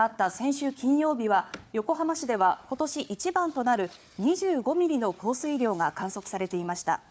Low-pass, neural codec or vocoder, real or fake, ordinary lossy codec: none; codec, 16 kHz, 4 kbps, FunCodec, trained on Chinese and English, 50 frames a second; fake; none